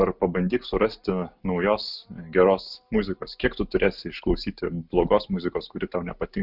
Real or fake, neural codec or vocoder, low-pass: real; none; 5.4 kHz